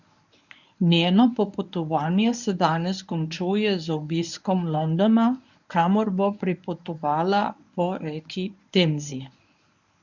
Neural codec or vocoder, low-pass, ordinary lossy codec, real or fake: codec, 24 kHz, 0.9 kbps, WavTokenizer, medium speech release version 1; 7.2 kHz; none; fake